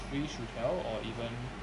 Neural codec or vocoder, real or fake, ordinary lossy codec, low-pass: none; real; AAC, 64 kbps; 10.8 kHz